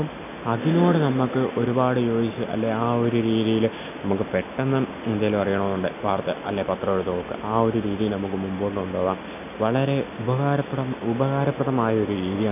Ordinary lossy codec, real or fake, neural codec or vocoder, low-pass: none; real; none; 3.6 kHz